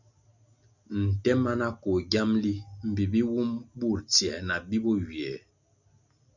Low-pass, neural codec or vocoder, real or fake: 7.2 kHz; none; real